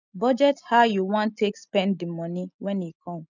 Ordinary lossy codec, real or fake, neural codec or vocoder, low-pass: none; real; none; 7.2 kHz